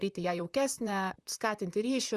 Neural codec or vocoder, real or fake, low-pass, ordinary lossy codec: vocoder, 44.1 kHz, 128 mel bands, Pupu-Vocoder; fake; 14.4 kHz; Opus, 64 kbps